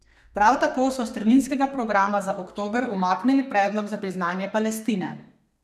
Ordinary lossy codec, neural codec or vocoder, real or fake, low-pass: none; codec, 32 kHz, 1.9 kbps, SNAC; fake; 14.4 kHz